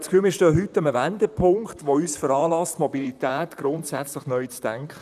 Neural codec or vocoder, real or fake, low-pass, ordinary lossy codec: vocoder, 44.1 kHz, 128 mel bands, Pupu-Vocoder; fake; 14.4 kHz; none